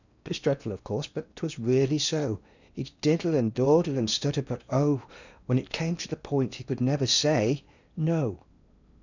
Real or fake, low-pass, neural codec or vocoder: fake; 7.2 kHz; codec, 16 kHz in and 24 kHz out, 0.8 kbps, FocalCodec, streaming, 65536 codes